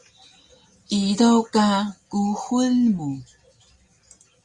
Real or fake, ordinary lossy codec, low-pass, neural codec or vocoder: real; Opus, 64 kbps; 10.8 kHz; none